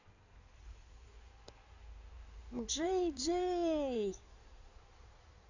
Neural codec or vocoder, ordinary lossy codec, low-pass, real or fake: codec, 16 kHz in and 24 kHz out, 2.2 kbps, FireRedTTS-2 codec; none; 7.2 kHz; fake